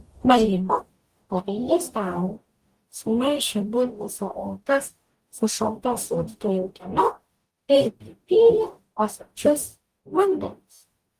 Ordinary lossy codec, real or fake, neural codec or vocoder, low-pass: Opus, 24 kbps; fake; codec, 44.1 kHz, 0.9 kbps, DAC; 14.4 kHz